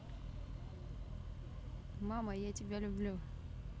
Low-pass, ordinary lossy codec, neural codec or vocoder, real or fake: none; none; none; real